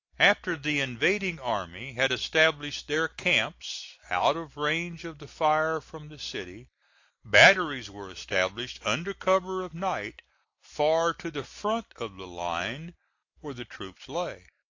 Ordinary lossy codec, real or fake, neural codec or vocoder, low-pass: AAC, 48 kbps; real; none; 7.2 kHz